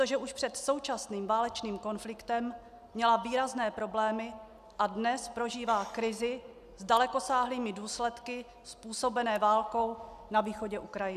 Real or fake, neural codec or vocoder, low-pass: real; none; 14.4 kHz